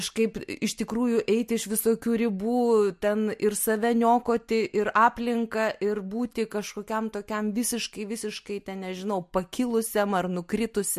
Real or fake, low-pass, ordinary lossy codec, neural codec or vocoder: real; 14.4 kHz; MP3, 64 kbps; none